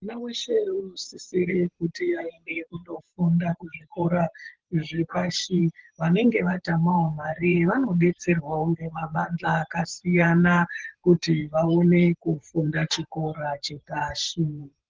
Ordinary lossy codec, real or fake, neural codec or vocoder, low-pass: Opus, 16 kbps; fake; vocoder, 44.1 kHz, 128 mel bands every 512 samples, BigVGAN v2; 7.2 kHz